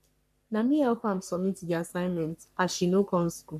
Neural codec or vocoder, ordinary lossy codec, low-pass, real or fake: codec, 44.1 kHz, 3.4 kbps, Pupu-Codec; none; 14.4 kHz; fake